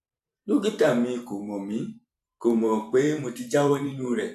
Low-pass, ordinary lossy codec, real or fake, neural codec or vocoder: 14.4 kHz; AAC, 96 kbps; fake; vocoder, 44.1 kHz, 128 mel bands every 512 samples, BigVGAN v2